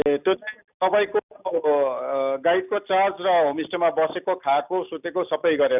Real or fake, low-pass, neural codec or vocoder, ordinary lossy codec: real; 3.6 kHz; none; none